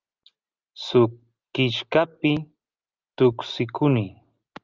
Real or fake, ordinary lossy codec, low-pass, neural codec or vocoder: real; Opus, 32 kbps; 7.2 kHz; none